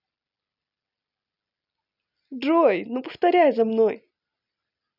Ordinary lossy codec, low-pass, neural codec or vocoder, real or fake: none; 5.4 kHz; none; real